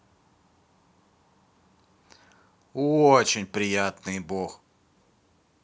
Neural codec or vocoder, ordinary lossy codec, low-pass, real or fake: none; none; none; real